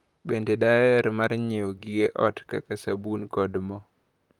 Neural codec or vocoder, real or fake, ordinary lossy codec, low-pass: vocoder, 44.1 kHz, 128 mel bands, Pupu-Vocoder; fake; Opus, 32 kbps; 19.8 kHz